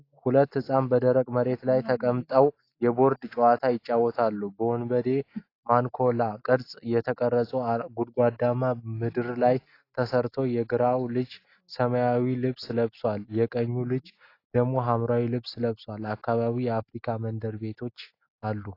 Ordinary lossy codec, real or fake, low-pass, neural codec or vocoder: AAC, 32 kbps; real; 5.4 kHz; none